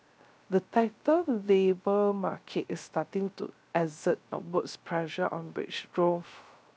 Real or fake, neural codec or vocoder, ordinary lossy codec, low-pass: fake; codec, 16 kHz, 0.3 kbps, FocalCodec; none; none